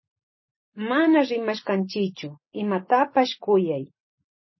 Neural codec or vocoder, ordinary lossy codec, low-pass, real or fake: none; MP3, 24 kbps; 7.2 kHz; real